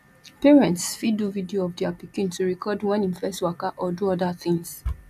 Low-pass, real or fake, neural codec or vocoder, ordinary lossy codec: 14.4 kHz; real; none; none